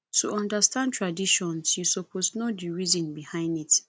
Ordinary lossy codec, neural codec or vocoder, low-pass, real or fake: none; none; none; real